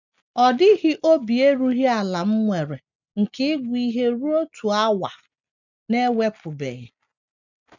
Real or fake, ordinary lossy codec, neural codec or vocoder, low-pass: real; none; none; 7.2 kHz